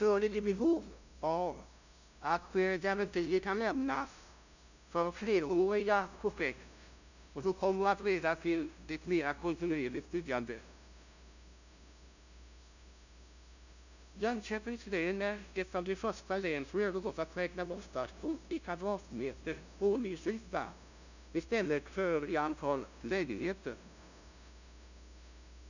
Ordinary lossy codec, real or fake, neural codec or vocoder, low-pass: none; fake; codec, 16 kHz, 0.5 kbps, FunCodec, trained on LibriTTS, 25 frames a second; 7.2 kHz